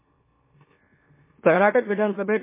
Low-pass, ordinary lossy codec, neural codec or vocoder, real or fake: 3.6 kHz; MP3, 16 kbps; autoencoder, 44.1 kHz, a latent of 192 numbers a frame, MeloTTS; fake